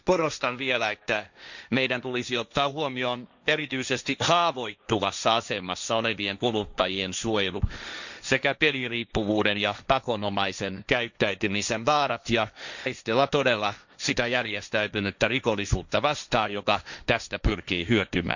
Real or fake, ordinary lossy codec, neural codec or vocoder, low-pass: fake; none; codec, 16 kHz, 1.1 kbps, Voila-Tokenizer; 7.2 kHz